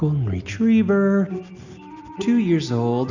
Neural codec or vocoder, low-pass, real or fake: none; 7.2 kHz; real